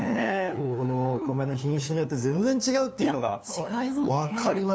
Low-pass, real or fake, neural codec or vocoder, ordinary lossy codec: none; fake; codec, 16 kHz, 2 kbps, FunCodec, trained on LibriTTS, 25 frames a second; none